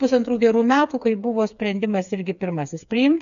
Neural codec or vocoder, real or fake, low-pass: codec, 16 kHz, 4 kbps, FreqCodec, smaller model; fake; 7.2 kHz